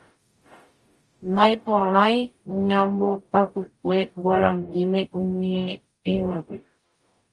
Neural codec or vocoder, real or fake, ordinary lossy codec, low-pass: codec, 44.1 kHz, 0.9 kbps, DAC; fake; Opus, 32 kbps; 10.8 kHz